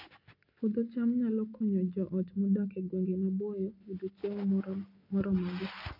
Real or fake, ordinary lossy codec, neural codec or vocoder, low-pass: real; AAC, 32 kbps; none; 5.4 kHz